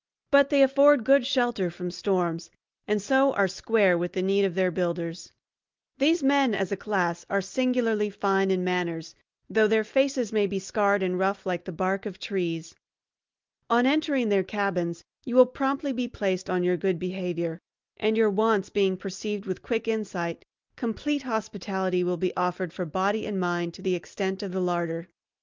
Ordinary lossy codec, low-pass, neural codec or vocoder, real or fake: Opus, 24 kbps; 7.2 kHz; none; real